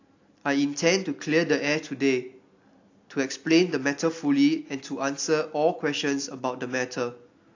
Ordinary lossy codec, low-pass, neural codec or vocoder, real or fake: AAC, 48 kbps; 7.2 kHz; none; real